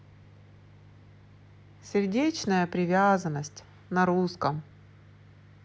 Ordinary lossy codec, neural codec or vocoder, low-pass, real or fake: none; none; none; real